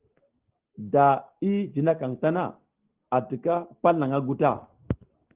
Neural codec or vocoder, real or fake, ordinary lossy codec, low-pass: none; real; Opus, 16 kbps; 3.6 kHz